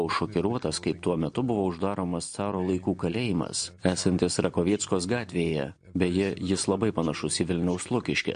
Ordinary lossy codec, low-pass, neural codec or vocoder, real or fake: MP3, 48 kbps; 9.9 kHz; none; real